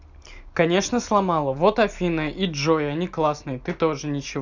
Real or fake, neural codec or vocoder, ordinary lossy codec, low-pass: real; none; none; 7.2 kHz